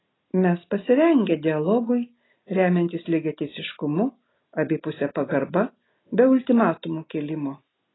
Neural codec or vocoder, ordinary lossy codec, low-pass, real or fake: none; AAC, 16 kbps; 7.2 kHz; real